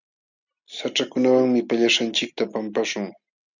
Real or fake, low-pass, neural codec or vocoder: real; 7.2 kHz; none